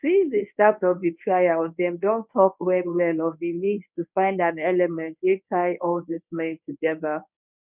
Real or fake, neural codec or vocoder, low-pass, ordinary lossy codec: fake; codec, 24 kHz, 0.9 kbps, WavTokenizer, medium speech release version 1; 3.6 kHz; none